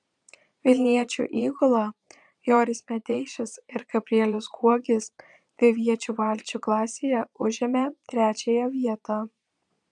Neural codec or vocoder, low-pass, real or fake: vocoder, 22.05 kHz, 80 mel bands, Vocos; 9.9 kHz; fake